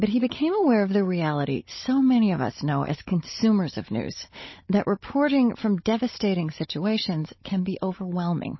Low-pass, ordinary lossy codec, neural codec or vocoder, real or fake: 7.2 kHz; MP3, 24 kbps; codec, 16 kHz, 16 kbps, FunCodec, trained on Chinese and English, 50 frames a second; fake